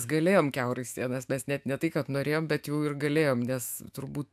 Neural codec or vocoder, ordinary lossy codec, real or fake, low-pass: autoencoder, 48 kHz, 128 numbers a frame, DAC-VAE, trained on Japanese speech; AAC, 96 kbps; fake; 14.4 kHz